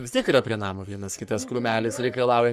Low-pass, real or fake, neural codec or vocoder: 14.4 kHz; fake; codec, 44.1 kHz, 3.4 kbps, Pupu-Codec